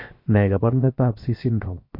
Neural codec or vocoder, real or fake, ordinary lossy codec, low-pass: codec, 16 kHz, 0.7 kbps, FocalCodec; fake; MP3, 32 kbps; 5.4 kHz